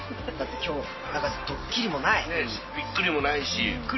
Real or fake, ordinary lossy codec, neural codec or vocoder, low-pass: real; MP3, 24 kbps; none; 7.2 kHz